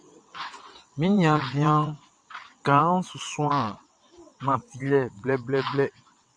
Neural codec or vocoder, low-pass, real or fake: vocoder, 22.05 kHz, 80 mel bands, WaveNeXt; 9.9 kHz; fake